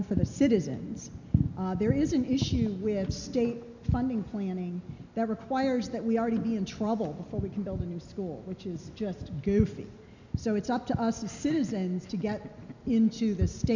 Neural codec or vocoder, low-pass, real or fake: none; 7.2 kHz; real